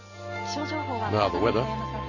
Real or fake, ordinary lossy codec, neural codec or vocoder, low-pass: real; none; none; 7.2 kHz